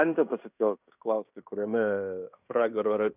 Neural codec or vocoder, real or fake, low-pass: codec, 16 kHz in and 24 kHz out, 0.9 kbps, LongCat-Audio-Codec, fine tuned four codebook decoder; fake; 3.6 kHz